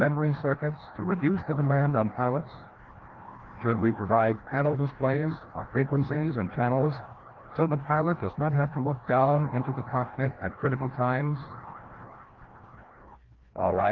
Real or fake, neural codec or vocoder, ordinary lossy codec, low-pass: fake; codec, 16 kHz, 1 kbps, FreqCodec, larger model; Opus, 16 kbps; 7.2 kHz